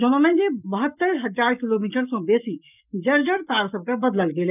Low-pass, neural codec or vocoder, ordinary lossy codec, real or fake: 3.6 kHz; codec, 44.1 kHz, 7.8 kbps, Pupu-Codec; none; fake